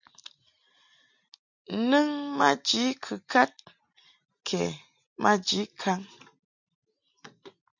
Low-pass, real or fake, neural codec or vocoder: 7.2 kHz; real; none